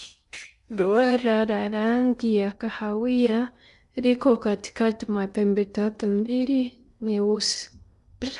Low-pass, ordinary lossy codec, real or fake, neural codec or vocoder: 10.8 kHz; none; fake; codec, 16 kHz in and 24 kHz out, 0.6 kbps, FocalCodec, streaming, 2048 codes